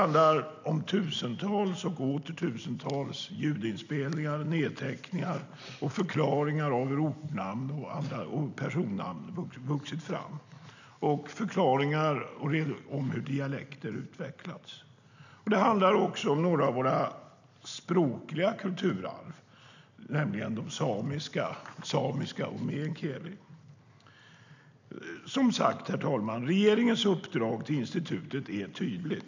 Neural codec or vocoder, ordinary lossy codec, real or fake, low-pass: none; none; real; 7.2 kHz